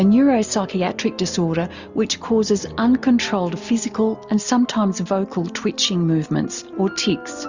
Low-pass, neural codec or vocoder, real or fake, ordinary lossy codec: 7.2 kHz; none; real; Opus, 64 kbps